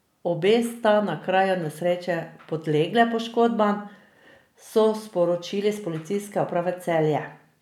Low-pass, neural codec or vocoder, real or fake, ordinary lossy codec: 19.8 kHz; none; real; none